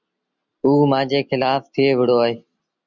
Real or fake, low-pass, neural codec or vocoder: real; 7.2 kHz; none